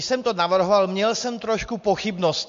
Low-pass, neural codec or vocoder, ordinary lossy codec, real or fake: 7.2 kHz; none; MP3, 48 kbps; real